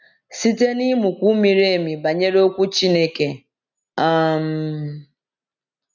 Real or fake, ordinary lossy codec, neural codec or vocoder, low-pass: real; none; none; 7.2 kHz